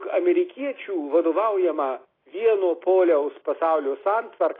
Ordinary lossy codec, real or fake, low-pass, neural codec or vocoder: AAC, 24 kbps; real; 5.4 kHz; none